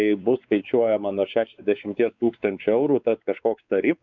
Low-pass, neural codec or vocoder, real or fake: 7.2 kHz; codec, 16 kHz, 4 kbps, X-Codec, WavLM features, trained on Multilingual LibriSpeech; fake